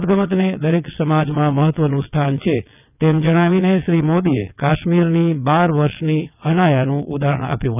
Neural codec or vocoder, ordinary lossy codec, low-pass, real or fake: vocoder, 22.05 kHz, 80 mel bands, WaveNeXt; none; 3.6 kHz; fake